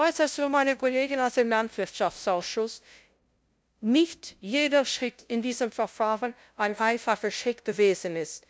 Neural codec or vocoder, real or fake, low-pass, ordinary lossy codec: codec, 16 kHz, 0.5 kbps, FunCodec, trained on LibriTTS, 25 frames a second; fake; none; none